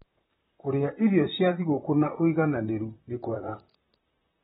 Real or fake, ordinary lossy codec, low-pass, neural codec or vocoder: fake; AAC, 16 kbps; 19.8 kHz; vocoder, 44.1 kHz, 128 mel bands, Pupu-Vocoder